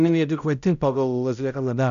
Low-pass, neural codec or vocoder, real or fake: 7.2 kHz; codec, 16 kHz, 0.5 kbps, X-Codec, HuBERT features, trained on balanced general audio; fake